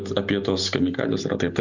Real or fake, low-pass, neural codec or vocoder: real; 7.2 kHz; none